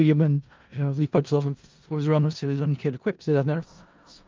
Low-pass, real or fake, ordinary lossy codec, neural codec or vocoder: 7.2 kHz; fake; Opus, 24 kbps; codec, 16 kHz in and 24 kHz out, 0.4 kbps, LongCat-Audio-Codec, four codebook decoder